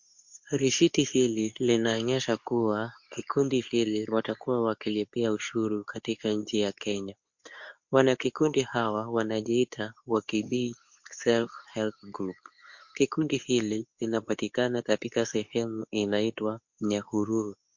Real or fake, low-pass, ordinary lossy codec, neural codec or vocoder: fake; 7.2 kHz; MP3, 48 kbps; codec, 24 kHz, 0.9 kbps, WavTokenizer, medium speech release version 2